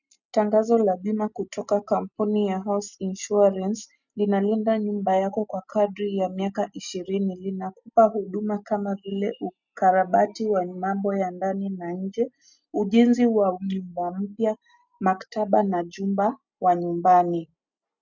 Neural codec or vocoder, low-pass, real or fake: none; 7.2 kHz; real